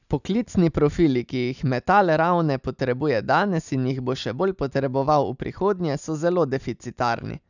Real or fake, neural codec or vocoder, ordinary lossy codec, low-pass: real; none; none; 7.2 kHz